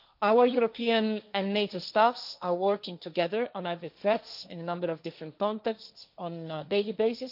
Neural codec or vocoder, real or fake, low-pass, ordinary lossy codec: codec, 16 kHz, 1.1 kbps, Voila-Tokenizer; fake; 5.4 kHz; none